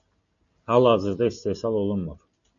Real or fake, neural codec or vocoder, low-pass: real; none; 7.2 kHz